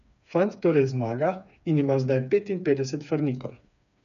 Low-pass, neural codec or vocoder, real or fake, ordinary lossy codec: 7.2 kHz; codec, 16 kHz, 4 kbps, FreqCodec, smaller model; fake; none